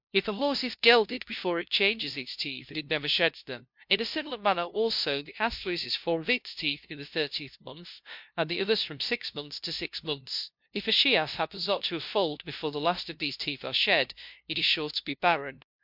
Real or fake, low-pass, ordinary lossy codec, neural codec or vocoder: fake; 5.4 kHz; MP3, 48 kbps; codec, 16 kHz, 0.5 kbps, FunCodec, trained on LibriTTS, 25 frames a second